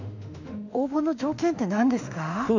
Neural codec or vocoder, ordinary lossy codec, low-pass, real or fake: autoencoder, 48 kHz, 32 numbers a frame, DAC-VAE, trained on Japanese speech; none; 7.2 kHz; fake